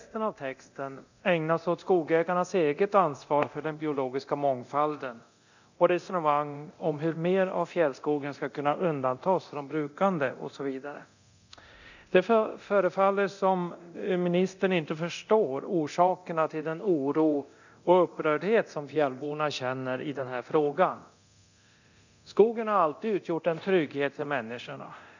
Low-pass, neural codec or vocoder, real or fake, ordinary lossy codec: 7.2 kHz; codec, 24 kHz, 0.9 kbps, DualCodec; fake; none